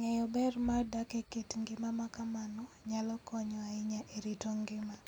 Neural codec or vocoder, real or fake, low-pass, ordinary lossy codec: none; real; 19.8 kHz; none